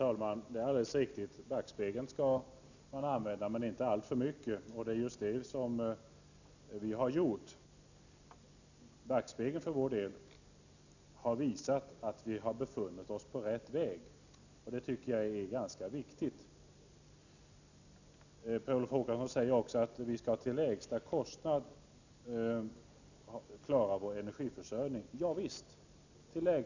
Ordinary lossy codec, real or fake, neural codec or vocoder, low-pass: none; real; none; 7.2 kHz